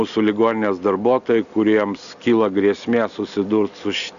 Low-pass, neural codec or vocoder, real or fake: 7.2 kHz; none; real